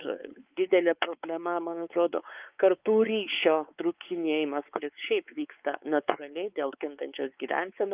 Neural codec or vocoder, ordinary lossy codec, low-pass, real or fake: codec, 16 kHz, 4 kbps, X-Codec, WavLM features, trained on Multilingual LibriSpeech; Opus, 24 kbps; 3.6 kHz; fake